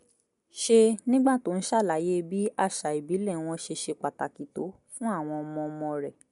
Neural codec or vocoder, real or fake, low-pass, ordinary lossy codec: none; real; 10.8 kHz; MP3, 64 kbps